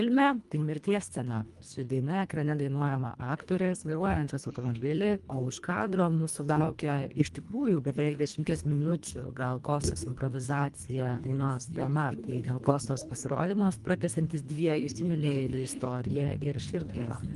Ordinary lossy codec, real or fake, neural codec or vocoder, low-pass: Opus, 32 kbps; fake; codec, 24 kHz, 1.5 kbps, HILCodec; 10.8 kHz